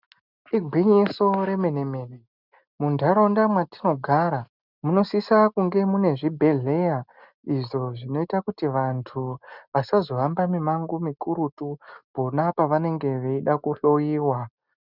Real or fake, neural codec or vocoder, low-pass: real; none; 5.4 kHz